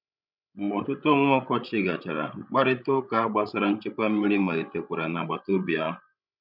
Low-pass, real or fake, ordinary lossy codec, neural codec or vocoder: 5.4 kHz; fake; none; codec, 16 kHz, 16 kbps, FreqCodec, larger model